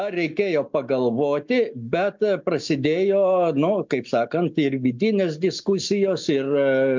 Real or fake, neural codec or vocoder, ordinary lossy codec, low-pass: real; none; MP3, 64 kbps; 7.2 kHz